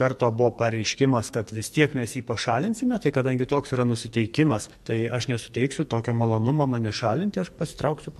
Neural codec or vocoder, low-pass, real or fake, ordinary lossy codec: codec, 44.1 kHz, 2.6 kbps, SNAC; 14.4 kHz; fake; MP3, 64 kbps